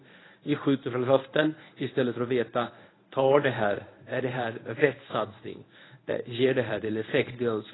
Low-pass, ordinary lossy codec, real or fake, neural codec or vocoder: 7.2 kHz; AAC, 16 kbps; fake; codec, 24 kHz, 0.9 kbps, WavTokenizer, medium speech release version 1